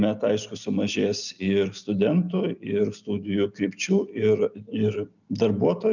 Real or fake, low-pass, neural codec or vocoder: real; 7.2 kHz; none